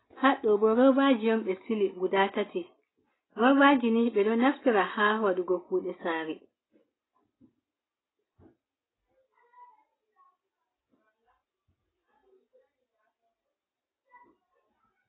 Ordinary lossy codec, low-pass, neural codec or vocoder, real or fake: AAC, 16 kbps; 7.2 kHz; none; real